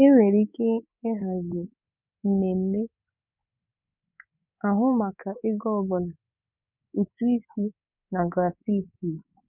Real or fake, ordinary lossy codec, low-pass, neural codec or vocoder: fake; none; 3.6 kHz; codec, 24 kHz, 3.1 kbps, DualCodec